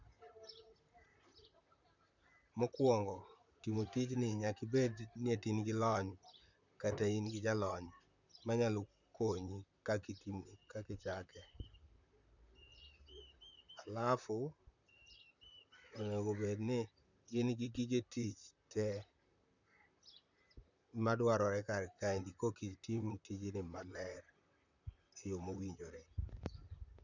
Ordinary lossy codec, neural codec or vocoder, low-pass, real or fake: none; vocoder, 44.1 kHz, 128 mel bands, Pupu-Vocoder; 7.2 kHz; fake